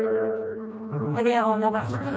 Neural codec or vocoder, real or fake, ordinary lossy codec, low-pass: codec, 16 kHz, 1 kbps, FreqCodec, smaller model; fake; none; none